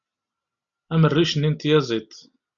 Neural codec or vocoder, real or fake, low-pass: none; real; 7.2 kHz